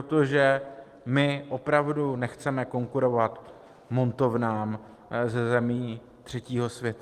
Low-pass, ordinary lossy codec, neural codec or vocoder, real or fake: 14.4 kHz; Opus, 24 kbps; none; real